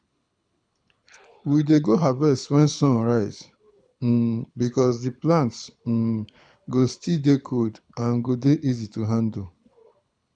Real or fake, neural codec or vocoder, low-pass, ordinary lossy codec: fake; codec, 24 kHz, 6 kbps, HILCodec; 9.9 kHz; none